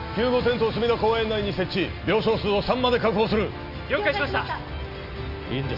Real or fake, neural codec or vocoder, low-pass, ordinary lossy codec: real; none; 5.4 kHz; none